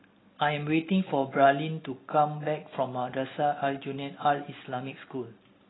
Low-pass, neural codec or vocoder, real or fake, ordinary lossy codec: 7.2 kHz; none; real; AAC, 16 kbps